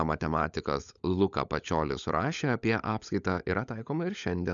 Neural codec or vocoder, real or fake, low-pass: codec, 16 kHz, 16 kbps, FunCodec, trained on LibriTTS, 50 frames a second; fake; 7.2 kHz